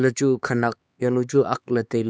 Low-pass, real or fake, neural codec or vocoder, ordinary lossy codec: none; fake; codec, 16 kHz, 4 kbps, X-Codec, HuBERT features, trained on LibriSpeech; none